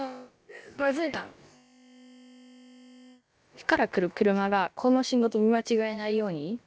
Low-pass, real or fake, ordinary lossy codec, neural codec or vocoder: none; fake; none; codec, 16 kHz, about 1 kbps, DyCAST, with the encoder's durations